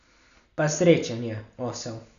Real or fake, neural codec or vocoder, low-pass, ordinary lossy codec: real; none; 7.2 kHz; none